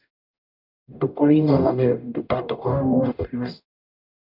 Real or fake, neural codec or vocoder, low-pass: fake; codec, 44.1 kHz, 0.9 kbps, DAC; 5.4 kHz